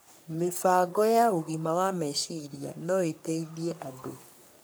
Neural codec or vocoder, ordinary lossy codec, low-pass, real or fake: codec, 44.1 kHz, 3.4 kbps, Pupu-Codec; none; none; fake